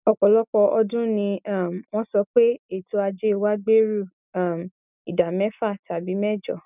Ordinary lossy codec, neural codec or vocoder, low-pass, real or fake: none; none; 3.6 kHz; real